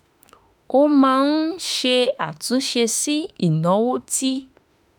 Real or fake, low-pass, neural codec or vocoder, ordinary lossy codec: fake; none; autoencoder, 48 kHz, 32 numbers a frame, DAC-VAE, trained on Japanese speech; none